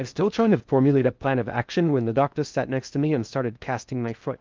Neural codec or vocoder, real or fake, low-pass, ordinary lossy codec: codec, 16 kHz in and 24 kHz out, 0.6 kbps, FocalCodec, streaming, 4096 codes; fake; 7.2 kHz; Opus, 24 kbps